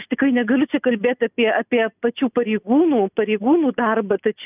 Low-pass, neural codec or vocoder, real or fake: 3.6 kHz; none; real